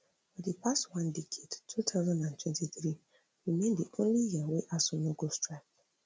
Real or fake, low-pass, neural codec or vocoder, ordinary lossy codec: real; none; none; none